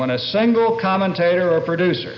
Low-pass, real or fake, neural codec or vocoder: 7.2 kHz; real; none